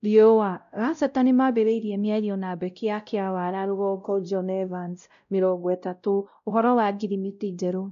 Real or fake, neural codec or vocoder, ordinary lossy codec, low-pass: fake; codec, 16 kHz, 0.5 kbps, X-Codec, WavLM features, trained on Multilingual LibriSpeech; none; 7.2 kHz